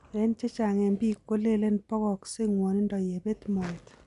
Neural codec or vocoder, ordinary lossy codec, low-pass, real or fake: none; none; 10.8 kHz; real